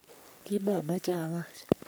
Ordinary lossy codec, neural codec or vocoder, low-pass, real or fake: none; codec, 44.1 kHz, 3.4 kbps, Pupu-Codec; none; fake